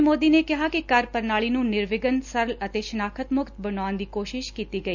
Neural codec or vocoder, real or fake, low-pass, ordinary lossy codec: none; real; 7.2 kHz; none